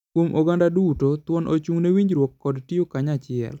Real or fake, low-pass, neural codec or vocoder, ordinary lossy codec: real; 19.8 kHz; none; none